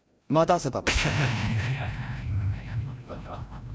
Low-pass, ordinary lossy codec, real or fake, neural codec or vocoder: none; none; fake; codec, 16 kHz, 0.5 kbps, FreqCodec, larger model